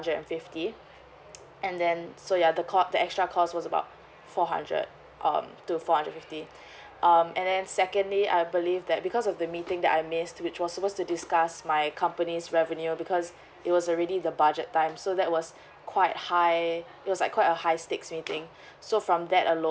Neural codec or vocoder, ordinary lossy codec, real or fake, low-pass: none; none; real; none